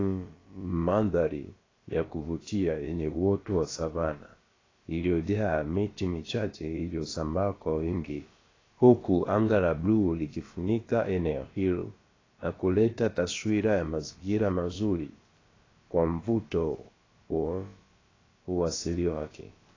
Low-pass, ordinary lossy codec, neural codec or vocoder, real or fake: 7.2 kHz; AAC, 32 kbps; codec, 16 kHz, about 1 kbps, DyCAST, with the encoder's durations; fake